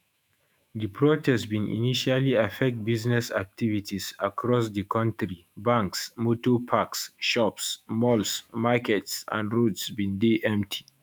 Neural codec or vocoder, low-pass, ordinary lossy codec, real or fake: autoencoder, 48 kHz, 128 numbers a frame, DAC-VAE, trained on Japanese speech; none; none; fake